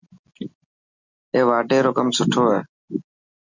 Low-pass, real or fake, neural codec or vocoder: 7.2 kHz; real; none